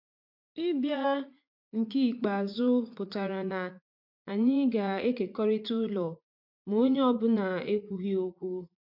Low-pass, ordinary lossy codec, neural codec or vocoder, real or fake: 5.4 kHz; MP3, 48 kbps; vocoder, 44.1 kHz, 80 mel bands, Vocos; fake